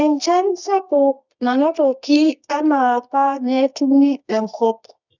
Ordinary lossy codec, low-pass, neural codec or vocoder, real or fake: none; 7.2 kHz; codec, 24 kHz, 0.9 kbps, WavTokenizer, medium music audio release; fake